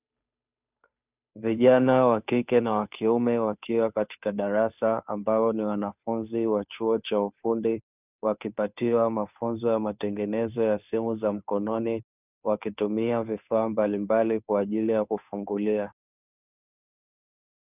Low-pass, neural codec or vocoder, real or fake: 3.6 kHz; codec, 16 kHz, 2 kbps, FunCodec, trained on Chinese and English, 25 frames a second; fake